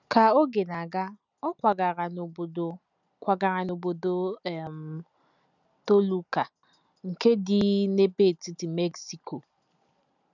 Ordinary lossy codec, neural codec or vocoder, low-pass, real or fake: none; none; 7.2 kHz; real